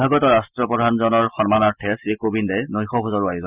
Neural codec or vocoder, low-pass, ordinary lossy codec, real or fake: none; 3.6 kHz; Opus, 64 kbps; real